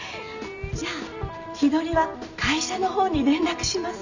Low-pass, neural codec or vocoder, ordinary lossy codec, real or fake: 7.2 kHz; none; none; real